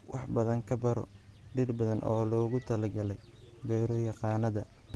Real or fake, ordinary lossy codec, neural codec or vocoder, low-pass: real; Opus, 16 kbps; none; 10.8 kHz